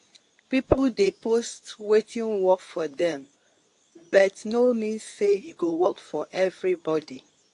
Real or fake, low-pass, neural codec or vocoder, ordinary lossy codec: fake; 10.8 kHz; codec, 24 kHz, 0.9 kbps, WavTokenizer, medium speech release version 2; AAC, 48 kbps